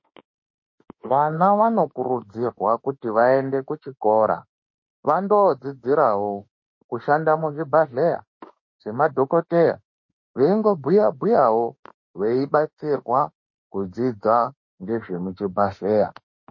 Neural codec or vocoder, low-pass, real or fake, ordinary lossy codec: autoencoder, 48 kHz, 32 numbers a frame, DAC-VAE, trained on Japanese speech; 7.2 kHz; fake; MP3, 32 kbps